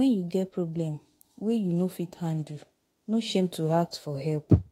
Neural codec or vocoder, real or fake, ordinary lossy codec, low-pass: autoencoder, 48 kHz, 32 numbers a frame, DAC-VAE, trained on Japanese speech; fake; AAC, 48 kbps; 19.8 kHz